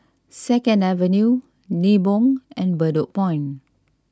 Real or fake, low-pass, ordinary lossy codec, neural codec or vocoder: real; none; none; none